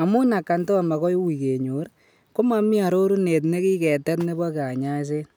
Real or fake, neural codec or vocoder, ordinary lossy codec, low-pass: real; none; none; none